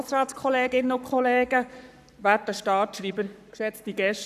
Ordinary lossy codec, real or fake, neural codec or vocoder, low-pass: none; fake; codec, 44.1 kHz, 7.8 kbps, Pupu-Codec; 14.4 kHz